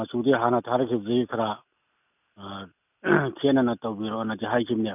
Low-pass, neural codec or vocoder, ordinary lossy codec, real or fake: 3.6 kHz; none; none; real